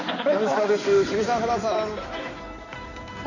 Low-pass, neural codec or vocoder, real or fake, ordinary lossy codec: 7.2 kHz; codec, 16 kHz in and 24 kHz out, 2.2 kbps, FireRedTTS-2 codec; fake; none